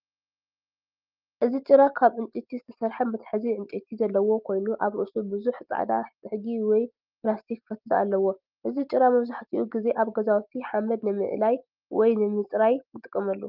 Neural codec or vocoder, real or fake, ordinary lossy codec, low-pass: none; real; Opus, 32 kbps; 5.4 kHz